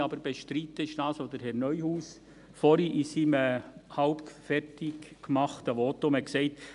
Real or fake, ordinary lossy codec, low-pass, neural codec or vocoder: real; none; 10.8 kHz; none